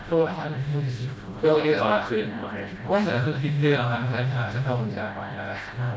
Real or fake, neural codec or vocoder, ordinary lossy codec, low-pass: fake; codec, 16 kHz, 0.5 kbps, FreqCodec, smaller model; none; none